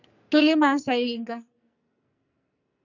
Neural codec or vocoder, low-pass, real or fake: codec, 44.1 kHz, 2.6 kbps, SNAC; 7.2 kHz; fake